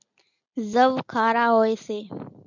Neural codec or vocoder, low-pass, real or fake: none; 7.2 kHz; real